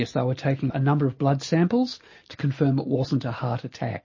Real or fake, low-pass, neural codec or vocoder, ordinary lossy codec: real; 7.2 kHz; none; MP3, 32 kbps